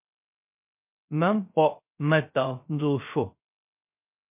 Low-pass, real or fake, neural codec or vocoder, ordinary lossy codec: 3.6 kHz; fake; codec, 16 kHz, 0.3 kbps, FocalCodec; MP3, 32 kbps